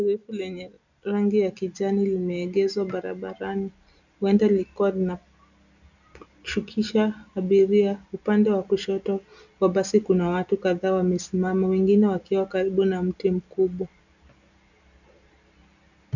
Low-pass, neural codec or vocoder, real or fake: 7.2 kHz; none; real